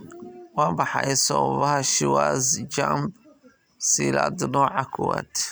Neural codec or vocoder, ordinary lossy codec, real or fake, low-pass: none; none; real; none